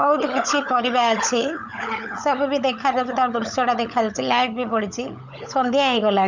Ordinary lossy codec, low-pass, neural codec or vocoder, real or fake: none; 7.2 kHz; codec, 16 kHz, 16 kbps, FunCodec, trained on LibriTTS, 50 frames a second; fake